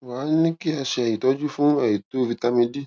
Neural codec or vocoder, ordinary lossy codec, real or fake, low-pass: none; none; real; none